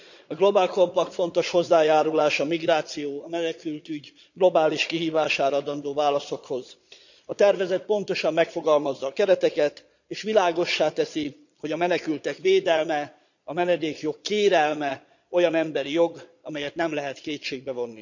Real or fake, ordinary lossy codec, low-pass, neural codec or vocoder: fake; none; 7.2 kHz; vocoder, 22.05 kHz, 80 mel bands, Vocos